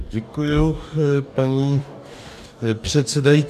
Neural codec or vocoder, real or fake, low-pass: codec, 44.1 kHz, 2.6 kbps, DAC; fake; 14.4 kHz